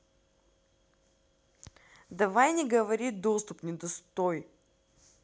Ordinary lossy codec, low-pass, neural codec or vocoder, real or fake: none; none; none; real